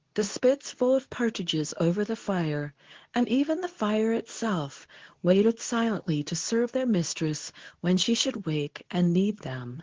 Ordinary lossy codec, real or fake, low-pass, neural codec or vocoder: Opus, 16 kbps; fake; 7.2 kHz; codec, 24 kHz, 0.9 kbps, WavTokenizer, medium speech release version 2